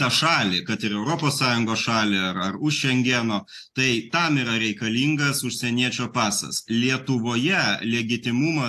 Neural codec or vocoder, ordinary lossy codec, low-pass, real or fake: none; AAC, 64 kbps; 14.4 kHz; real